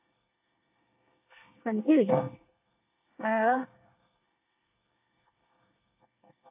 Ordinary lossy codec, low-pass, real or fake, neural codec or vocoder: AAC, 24 kbps; 3.6 kHz; fake; codec, 24 kHz, 1 kbps, SNAC